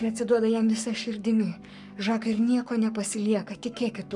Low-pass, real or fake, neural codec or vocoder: 10.8 kHz; fake; codec, 44.1 kHz, 7.8 kbps, Pupu-Codec